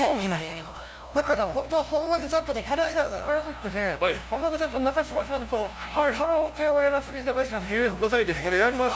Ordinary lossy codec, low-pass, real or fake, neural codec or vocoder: none; none; fake; codec, 16 kHz, 0.5 kbps, FunCodec, trained on LibriTTS, 25 frames a second